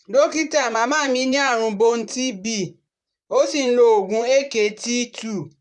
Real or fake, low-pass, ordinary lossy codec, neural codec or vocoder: fake; 10.8 kHz; none; vocoder, 44.1 kHz, 128 mel bands, Pupu-Vocoder